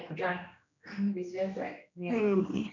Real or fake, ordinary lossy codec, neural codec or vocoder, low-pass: fake; none; codec, 16 kHz, 1 kbps, X-Codec, HuBERT features, trained on general audio; 7.2 kHz